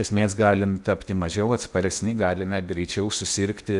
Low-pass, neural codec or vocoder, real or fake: 10.8 kHz; codec, 16 kHz in and 24 kHz out, 0.8 kbps, FocalCodec, streaming, 65536 codes; fake